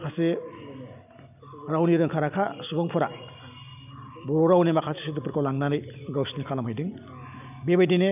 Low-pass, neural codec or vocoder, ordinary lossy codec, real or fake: 3.6 kHz; none; none; real